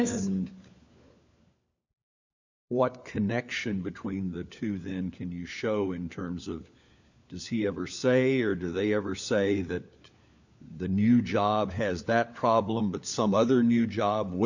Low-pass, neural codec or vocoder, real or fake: 7.2 kHz; codec, 16 kHz, 4 kbps, FunCodec, trained on LibriTTS, 50 frames a second; fake